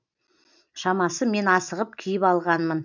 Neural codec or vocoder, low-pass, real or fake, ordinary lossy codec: none; 7.2 kHz; real; none